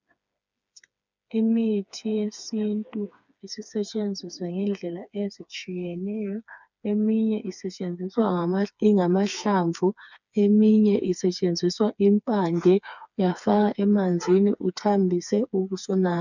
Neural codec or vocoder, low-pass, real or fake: codec, 16 kHz, 4 kbps, FreqCodec, smaller model; 7.2 kHz; fake